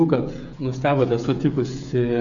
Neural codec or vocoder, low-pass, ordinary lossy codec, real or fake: codec, 16 kHz, 16 kbps, FreqCodec, smaller model; 7.2 kHz; MP3, 96 kbps; fake